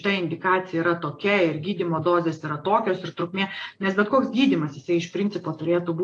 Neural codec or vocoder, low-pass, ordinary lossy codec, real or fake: none; 9.9 kHz; AAC, 48 kbps; real